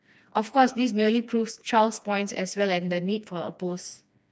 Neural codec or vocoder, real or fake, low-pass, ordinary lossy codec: codec, 16 kHz, 2 kbps, FreqCodec, smaller model; fake; none; none